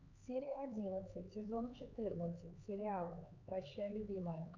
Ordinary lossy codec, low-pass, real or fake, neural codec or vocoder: Opus, 64 kbps; 7.2 kHz; fake; codec, 16 kHz, 4 kbps, X-Codec, HuBERT features, trained on LibriSpeech